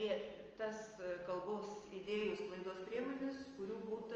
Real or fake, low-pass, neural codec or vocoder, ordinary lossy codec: real; 7.2 kHz; none; Opus, 24 kbps